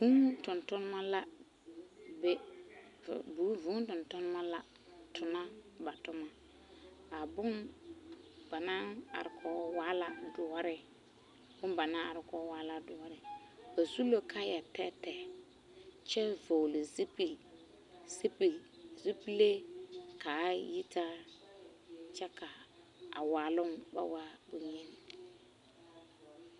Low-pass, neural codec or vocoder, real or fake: 10.8 kHz; none; real